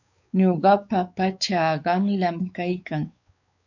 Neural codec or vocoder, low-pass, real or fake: codec, 16 kHz, 4 kbps, X-Codec, WavLM features, trained on Multilingual LibriSpeech; 7.2 kHz; fake